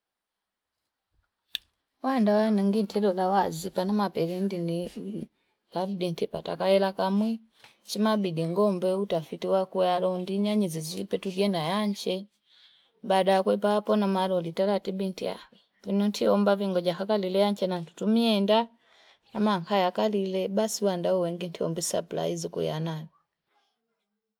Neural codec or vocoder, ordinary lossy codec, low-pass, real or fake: none; none; 19.8 kHz; real